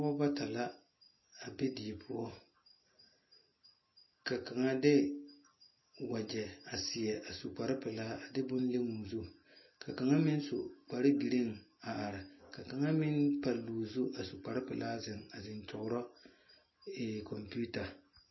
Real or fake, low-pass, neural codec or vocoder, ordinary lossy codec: real; 7.2 kHz; none; MP3, 24 kbps